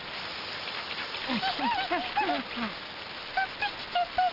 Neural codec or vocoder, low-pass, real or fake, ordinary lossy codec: none; 5.4 kHz; real; Opus, 32 kbps